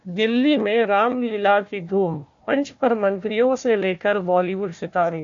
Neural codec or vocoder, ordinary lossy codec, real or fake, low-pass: codec, 16 kHz, 1 kbps, FunCodec, trained on Chinese and English, 50 frames a second; MP3, 48 kbps; fake; 7.2 kHz